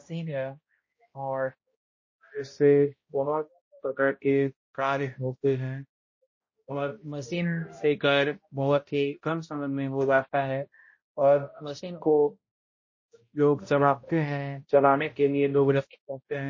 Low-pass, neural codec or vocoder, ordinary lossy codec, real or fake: 7.2 kHz; codec, 16 kHz, 0.5 kbps, X-Codec, HuBERT features, trained on balanced general audio; MP3, 32 kbps; fake